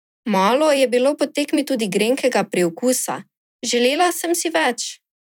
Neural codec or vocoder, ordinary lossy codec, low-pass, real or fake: vocoder, 44.1 kHz, 128 mel bands every 256 samples, BigVGAN v2; none; 19.8 kHz; fake